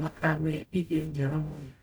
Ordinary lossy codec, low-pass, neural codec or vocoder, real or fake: none; none; codec, 44.1 kHz, 0.9 kbps, DAC; fake